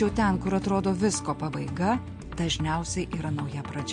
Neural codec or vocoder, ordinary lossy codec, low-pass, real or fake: none; MP3, 48 kbps; 9.9 kHz; real